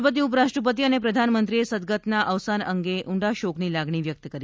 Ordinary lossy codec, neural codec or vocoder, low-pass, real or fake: none; none; none; real